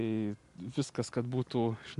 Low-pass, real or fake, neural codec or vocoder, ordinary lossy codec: 10.8 kHz; real; none; MP3, 64 kbps